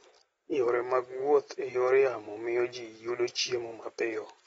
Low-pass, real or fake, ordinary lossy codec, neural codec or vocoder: 19.8 kHz; real; AAC, 24 kbps; none